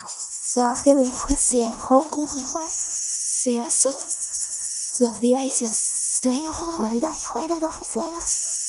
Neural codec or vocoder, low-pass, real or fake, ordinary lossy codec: codec, 16 kHz in and 24 kHz out, 0.4 kbps, LongCat-Audio-Codec, four codebook decoder; 10.8 kHz; fake; none